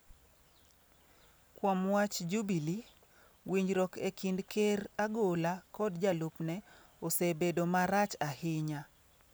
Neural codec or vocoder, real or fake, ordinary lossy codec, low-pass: none; real; none; none